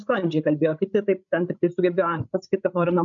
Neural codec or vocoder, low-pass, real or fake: codec, 16 kHz, 16 kbps, FreqCodec, larger model; 7.2 kHz; fake